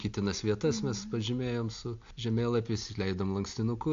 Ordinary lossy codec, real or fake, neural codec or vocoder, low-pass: AAC, 48 kbps; real; none; 7.2 kHz